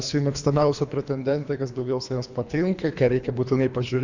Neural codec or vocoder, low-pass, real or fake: codec, 24 kHz, 3 kbps, HILCodec; 7.2 kHz; fake